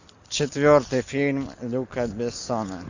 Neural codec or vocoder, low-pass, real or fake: none; 7.2 kHz; real